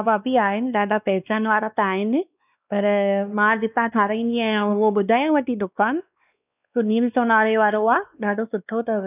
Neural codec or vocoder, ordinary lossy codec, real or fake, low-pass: codec, 16 kHz, 2 kbps, X-Codec, WavLM features, trained on Multilingual LibriSpeech; none; fake; 3.6 kHz